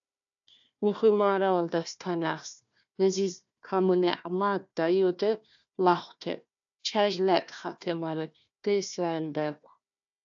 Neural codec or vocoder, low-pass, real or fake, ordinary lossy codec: codec, 16 kHz, 1 kbps, FunCodec, trained on Chinese and English, 50 frames a second; 7.2 kHz; fake; AAC, 64 kbps